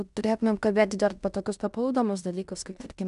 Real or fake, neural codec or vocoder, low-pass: fake; codec, 16 kHz in and 24 kHz out, 0.9 kbps, LongCat-Audio-Codec, fine tuned four codebook decoder; 10.8 kHz